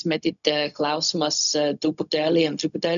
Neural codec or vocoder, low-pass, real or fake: codec, 16 kHz, 0.4 kbps, LongCat-Audio-Codec; 7.2 kHz; fake